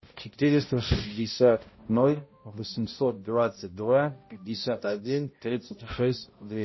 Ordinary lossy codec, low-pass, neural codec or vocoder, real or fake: MP3, 24 kbps; 7.2 kHz; codec, 16 kHz, 0.5 kbps, X-Codec, HuBERT features, trained on balanced general audio; fake